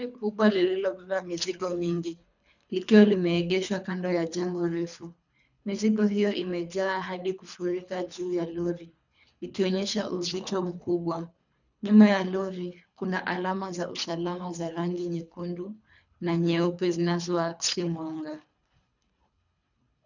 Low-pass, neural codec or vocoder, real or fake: 7.2 kHz; codec, 24 kHz, 3 kbps, HILCodec; fake